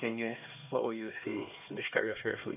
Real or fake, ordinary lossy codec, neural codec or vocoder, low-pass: fake; none; codec, 16 kHz, 2 kbps, X-Codec, HuBERT features, trained on LibriSpeech; 3.6 kHz